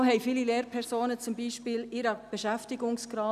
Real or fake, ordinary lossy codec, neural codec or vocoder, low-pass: real; none; none; 14.4 kHz